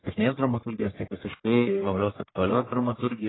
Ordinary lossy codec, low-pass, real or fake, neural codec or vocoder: AAC, 16 kbps; 7.2 kHz; fake; codec, 44.1 kHz, 1.7 kbps, Pupu-Codec